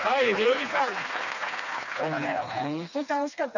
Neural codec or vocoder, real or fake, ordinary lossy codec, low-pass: codec, 16 kHz, 2 kbps, FreqCodec, smaller model; fake; none; 7.2 kHz